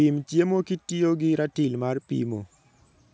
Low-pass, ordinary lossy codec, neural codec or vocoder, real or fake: none; none; none; real